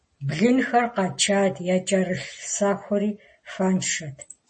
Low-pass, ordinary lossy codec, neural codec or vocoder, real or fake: 10.8 kHz; MP3, 32 kbps; none; real